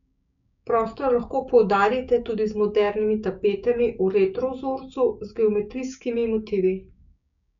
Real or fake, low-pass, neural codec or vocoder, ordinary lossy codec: fake; 7.2 kHz; codec, 16 kHz, 6 kbps, DAC; none